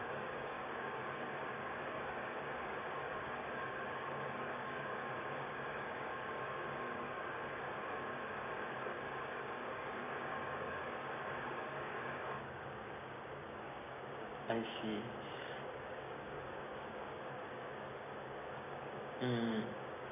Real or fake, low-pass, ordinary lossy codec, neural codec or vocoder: fake; 3.6 kHz; none; codec, 16 kHz, 6 kbps, DAC